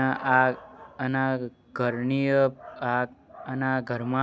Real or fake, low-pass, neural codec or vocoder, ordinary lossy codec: real; none; none; none